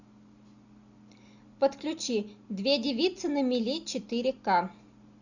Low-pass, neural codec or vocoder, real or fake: 7.2 kHz; none; real